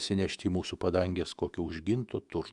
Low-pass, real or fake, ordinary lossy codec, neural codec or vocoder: 10.8 kHz; fake; Opus, 64 kbps; autoencoder, 48 kHz, 128 numbers a frame, DAC-VAE, trained on Japanese speech